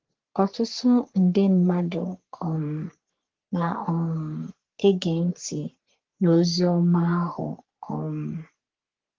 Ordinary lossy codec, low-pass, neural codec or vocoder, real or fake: Opus, 16 kbps; 7.2 kHz; codec, 44.1 kHz, 3.4 kbps, Pupu-Codec; fake